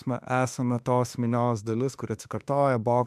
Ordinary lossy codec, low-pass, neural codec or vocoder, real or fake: MP3, 96 kbps; 14.4 kHz; autoencoder, 48 kHz, 32 numbers a frame, DAC-VAE, trained on Japanese speech; fake